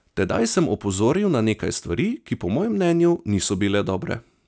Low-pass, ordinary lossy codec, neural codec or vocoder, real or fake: none; none; none; real